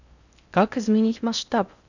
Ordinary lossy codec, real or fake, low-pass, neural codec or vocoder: none; fake; 7.2 kHz; codec, 16 kHz in and 24 kHz out, 0.6 kbps, FocalCodec, streaming, 4096 codes